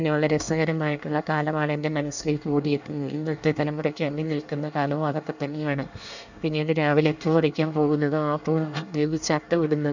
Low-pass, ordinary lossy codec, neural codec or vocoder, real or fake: 7.2 kHz; none; codec, 24 kHz, 1 kbps, SNAC; fake